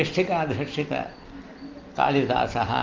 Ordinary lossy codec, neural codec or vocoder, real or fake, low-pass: Opus, 16 kbps; none; real; 7.2 kHz